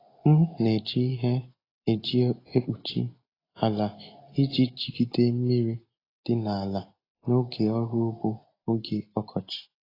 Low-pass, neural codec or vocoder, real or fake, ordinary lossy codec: 5.4 kHz; none; real; AAC, 24 kbps